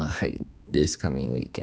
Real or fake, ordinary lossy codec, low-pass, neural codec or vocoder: fake; none; none; codec, 16 kHz, 2 kbps, X-Codec, HuBERT features, trained on balanced general audio